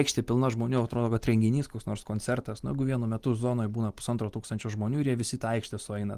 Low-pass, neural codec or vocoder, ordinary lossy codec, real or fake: 14.4 kHz; none; Opus, 24 kbps; real